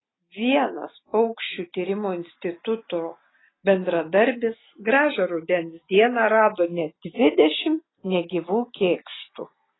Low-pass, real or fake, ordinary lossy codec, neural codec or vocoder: 7.2 kHz; real; AAC, 16 kbps; none